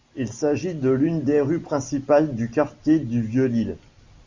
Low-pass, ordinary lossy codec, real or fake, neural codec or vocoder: 7.2 kHz; MP3, 64 kbps; real; none